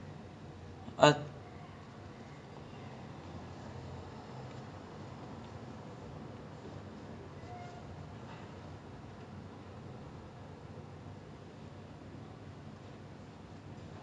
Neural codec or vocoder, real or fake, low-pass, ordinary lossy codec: none; real; 9.9 kHz; none